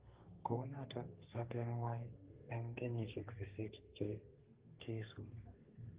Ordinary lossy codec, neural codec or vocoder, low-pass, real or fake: Opus, 16 kbps; codec, 32 kHz, 1.9 kbps, SNAC; 3.6 kHz; fake